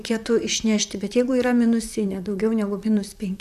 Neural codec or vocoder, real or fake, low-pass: vocoder, 44.1 kHz, 128 mel bands, Pupu-Vocoder; fake; 14.4 kHz